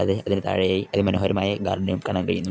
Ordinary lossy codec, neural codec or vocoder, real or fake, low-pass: none; none; real; none